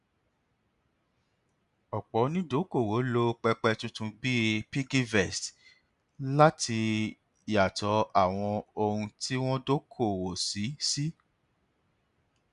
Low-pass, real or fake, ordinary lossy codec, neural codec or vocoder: 10.8 kHz; real; none; none